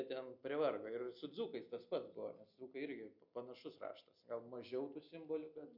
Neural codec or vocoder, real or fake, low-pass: none; real; 5.4 kHz